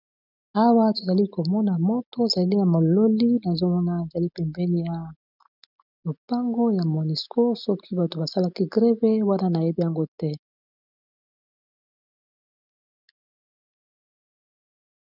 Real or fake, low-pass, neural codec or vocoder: real; 5.4 kHz; none